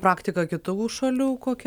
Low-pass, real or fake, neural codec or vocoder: 19.8 kHz; real; none